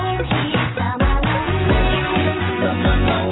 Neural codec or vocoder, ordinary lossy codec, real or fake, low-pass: none; AAC, 16 kbps; real; 7.2 kHz